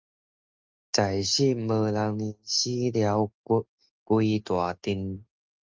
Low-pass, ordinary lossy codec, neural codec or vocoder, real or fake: 7.2 kHz; Opus, 32 kbps; none; real